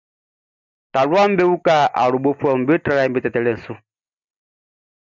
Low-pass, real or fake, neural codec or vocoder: 7.2 kHz; real; none